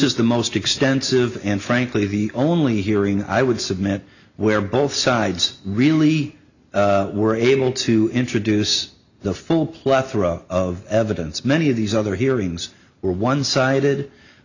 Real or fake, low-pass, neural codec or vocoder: real; 7.2 kHz; none